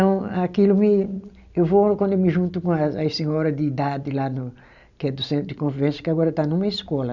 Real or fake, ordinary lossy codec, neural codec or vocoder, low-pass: real; none; none; 7.2 kHz